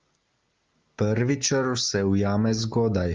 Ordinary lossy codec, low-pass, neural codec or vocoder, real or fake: Opus, 24 kbps; 7.2 kHz; none; real